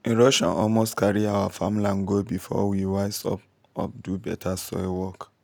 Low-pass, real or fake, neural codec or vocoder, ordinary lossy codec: none; real; none; none